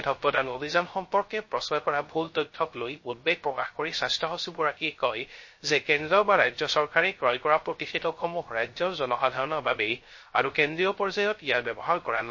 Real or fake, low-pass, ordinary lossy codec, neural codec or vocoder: fake; 7.2 kHz; MP3, 32 kbps; codec, 16 kHz, 0.3 kbps, FocalCodec